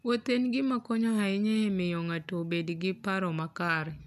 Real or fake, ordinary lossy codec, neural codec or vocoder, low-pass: real; none; none; 14.4 kHz